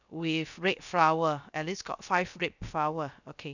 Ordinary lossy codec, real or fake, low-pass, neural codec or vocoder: none; fake; 7.2 kHz; codec, 16 kHz, about 1 kbps, DyCAST, with the encoder's durations